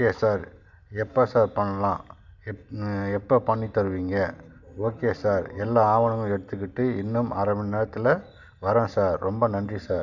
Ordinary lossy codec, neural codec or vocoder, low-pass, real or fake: none; none; 7.2 kHz; real